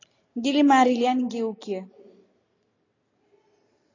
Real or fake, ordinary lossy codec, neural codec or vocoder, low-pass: real; AAC, 32 kbps; none; 7.2 kHz